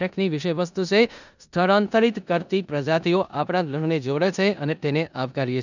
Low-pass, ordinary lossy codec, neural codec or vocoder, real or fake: 7.2 kHz; none; codec, 16 kHz in and 24 kHz out, 0.9 kbps, LongCat-Audio-Codec, four codebook decoder; fake